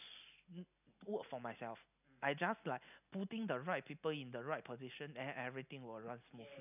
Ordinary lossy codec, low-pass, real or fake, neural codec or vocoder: none; 3.6 kHz; real; none